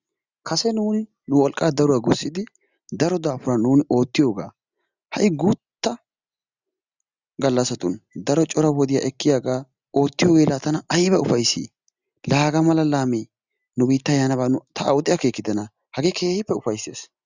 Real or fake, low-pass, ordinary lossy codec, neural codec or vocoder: real; 7.2 kHz; Opus, 64 kbps; none